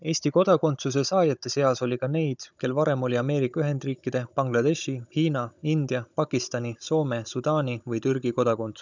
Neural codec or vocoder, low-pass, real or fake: codec, 16 kHz, 16 kbps, FunCodec, trained on Chinese and English, 50 frames a second; 7.2 kHz; fake